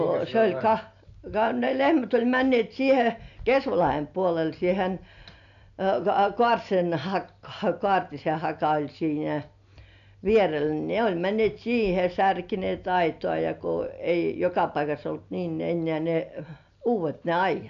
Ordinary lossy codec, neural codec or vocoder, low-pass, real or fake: none; none; 7.2 kHz; real